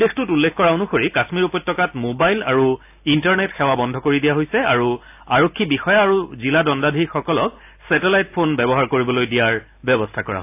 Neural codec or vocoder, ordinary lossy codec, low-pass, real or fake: none; AAC, 32 kbps; 3.6 kHz; real